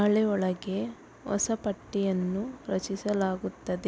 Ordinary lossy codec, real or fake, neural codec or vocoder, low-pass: none; real; none; none